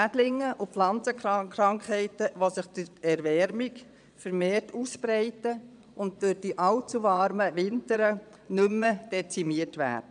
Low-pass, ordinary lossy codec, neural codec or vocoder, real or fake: 9.9 kHz; none; vocoder, 22.05 kHz, 80 mel bands, Vocos; fake